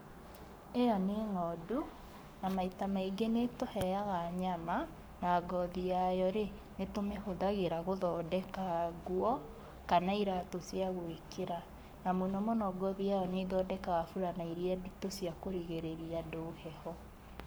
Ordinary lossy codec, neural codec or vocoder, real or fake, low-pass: none; codec, 44.1 kHz, 7.8 kbps, Pupu-Codec; fake; none